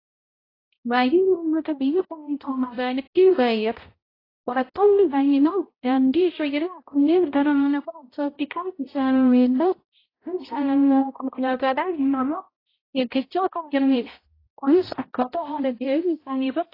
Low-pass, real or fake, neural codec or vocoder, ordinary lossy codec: 5.4 kHz; fake; codec, 16 kHz, 0.5 kbps, X-Codec, HuBERT features, trained on balanced general audio; AAC, 24 kbps